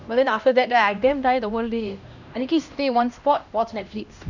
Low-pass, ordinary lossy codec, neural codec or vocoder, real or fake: 7.2 kHz; none; codec, 16 kHz, 1 kbps, X-Codec, HuBERT features, trained on LibriSpeech; fake